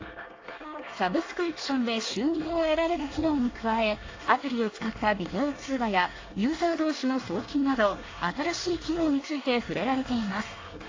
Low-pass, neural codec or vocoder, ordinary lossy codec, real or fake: 7.2 kHz; codec, 24 kHz, 1 kbps, SNAC; AAC, 32 kbps; fake